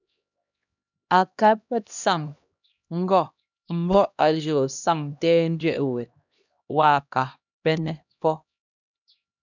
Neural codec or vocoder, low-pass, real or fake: codec, 16 kHz, 1 kbps, X-Codec, HuBERT features, trained on LibriSpeech; 7.2 kHz; fake